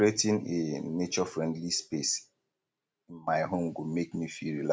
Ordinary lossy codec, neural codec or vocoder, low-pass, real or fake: none; none; none; real